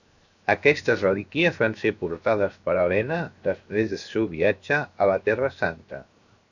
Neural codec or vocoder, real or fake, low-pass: codec, 16 kHz, 0.7 kbps, FocalCodec; fake; 7.2 kHz